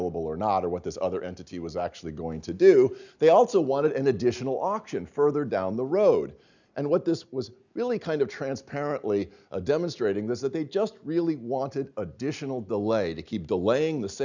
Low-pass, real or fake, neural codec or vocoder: 7.2 kHz; real; none